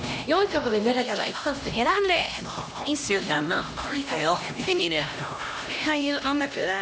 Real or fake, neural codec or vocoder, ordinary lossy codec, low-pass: fake; codec, 16 kHz, 1 kbps, X-Codec, HuBERT features, trained on LibriSpeech; none; none